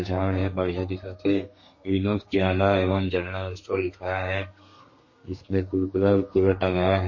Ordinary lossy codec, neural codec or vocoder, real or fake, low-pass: MP3, 32 kbps; codec, 32 kHz, 1.9 kbps, SNAC; fake; 7.2 kHz